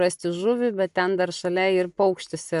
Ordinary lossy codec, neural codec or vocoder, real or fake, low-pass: MP3, 96 kbps; none; real; 10.8 kHz